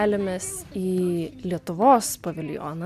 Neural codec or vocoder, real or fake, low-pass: none; real; 14.4 kHz